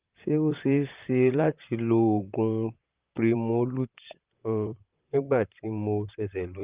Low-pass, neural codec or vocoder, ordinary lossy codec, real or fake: 3.6 kHz; vocoder, 44.1 kHz, 128 mel bands, Pupu-Vocoder; Opus, 32 kbps; fake